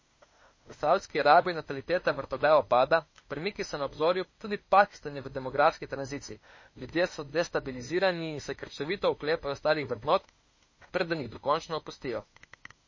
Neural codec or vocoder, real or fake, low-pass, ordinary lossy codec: autoencoder, 48 kHz, 32 numbers a frame, DAC-VAE, trained on Japanese speech; fake; 7.2 kHz; MP3, 32 kbps